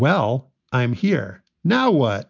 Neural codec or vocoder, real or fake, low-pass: none; real; 7.2 kHz